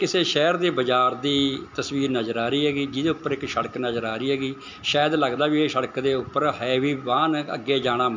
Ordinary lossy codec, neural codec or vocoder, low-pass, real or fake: MP3, 64 kbps; none; 7.2 kHz; real